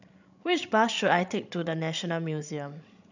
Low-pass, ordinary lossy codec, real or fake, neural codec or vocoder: 7.2 kHz; none; fake; codec, 16 kHz, 16 kbps, FreqCodec, larger model